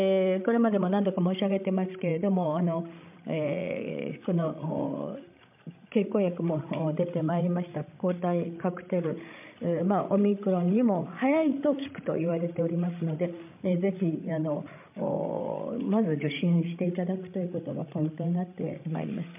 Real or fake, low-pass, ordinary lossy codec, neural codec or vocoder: fake; 3.6 kHz; MP3, 32 kbps; codec, 16 kHz, 16 kbps, FreqCodec, larger model